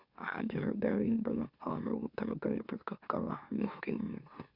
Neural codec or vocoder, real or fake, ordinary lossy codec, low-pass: autoencoder, 44.1 kHz, a latent of 192 numbers a frame, MeloTTS; fake; Opus, 64 kbps; 5.4 kHz